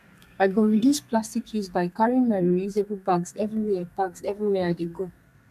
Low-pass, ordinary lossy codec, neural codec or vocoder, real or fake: 14.4 kHz; none; codec, 32 kHz, 1.9 kbps, SNAC; fake